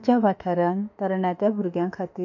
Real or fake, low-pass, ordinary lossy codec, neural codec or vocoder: fake; 7.2 kHz; none; autoencoder, 48 kHz, 32 numbers a frame, DAC-VAE, trained on Japanese speech